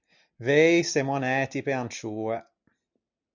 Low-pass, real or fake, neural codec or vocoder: 7.2 kHz; real; none